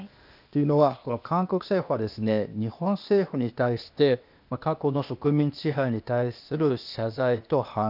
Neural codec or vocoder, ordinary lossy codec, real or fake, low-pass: codec, 16 kHz, 0.8 kbps, ZipCodec; none; fake; 5.4 kHz